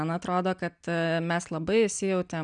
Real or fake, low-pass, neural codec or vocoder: real; 9.9 kHz; none